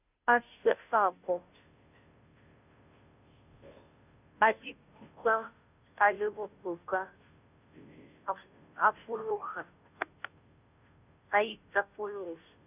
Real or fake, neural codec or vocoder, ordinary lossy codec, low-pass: fake; codec, 16 kHz, 0.5 kbps, FunCodec, trained on Chinese and English, 25 frames a second; none; 3.6 kHz